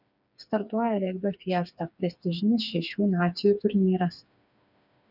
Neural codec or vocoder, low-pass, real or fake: codec, 16 kHz, 4 kbps, FreqCodec, smaller model; 5.4 kHz; fake